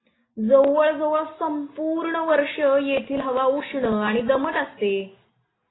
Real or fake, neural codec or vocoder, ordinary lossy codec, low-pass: real; none; AAC, 16 kbps; 7.2 kHz